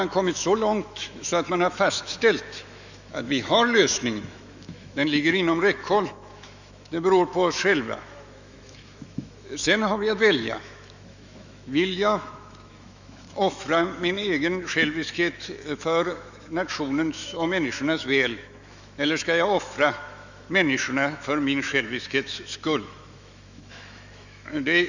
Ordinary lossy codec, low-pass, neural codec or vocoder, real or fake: none; 7.2 kHz; autoencoder, 48 kHz, 128 numbers a frame, DAC-VAE, trained on Japanese speech; fake